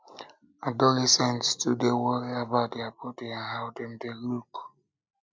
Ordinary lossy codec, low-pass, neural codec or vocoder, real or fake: none; none; none; real